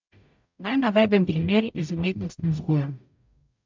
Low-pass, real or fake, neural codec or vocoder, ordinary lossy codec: 7.2 kHz; fake; codec, 44.1 kHz, 0.9 kbps, DAC; MP3, 64 kbps